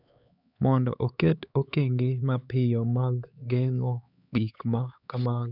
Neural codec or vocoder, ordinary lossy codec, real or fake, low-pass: codec, 16 kHz, 4 kbps, X-Codec, HuBERT features, trained on LibriSpeech; none; fake; 5.4 kHz